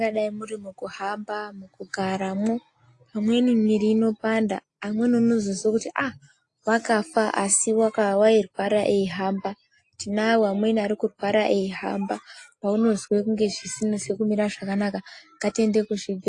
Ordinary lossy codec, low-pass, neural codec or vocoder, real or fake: AAC, 48 kbps; 10.8 kHz; none; real